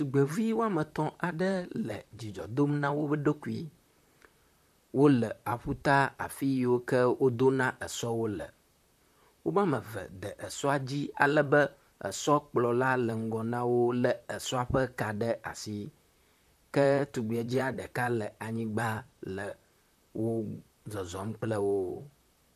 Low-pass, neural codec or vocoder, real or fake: 14.4 kHz; vocoder, 44.1 kHz, 128 mel bands, Pupu-Vocoder; fake